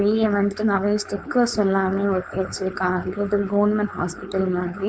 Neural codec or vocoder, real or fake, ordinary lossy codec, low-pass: codec, 16 kHz, 4.8 kbps, FACodec; fake; none; none